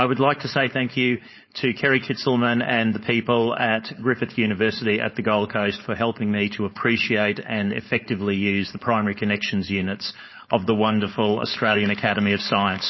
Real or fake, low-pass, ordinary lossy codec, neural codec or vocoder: fake; 7.2 kHz; MP3, 24 kbps; codec, 16 kHz, 4.8 kbps, FACodec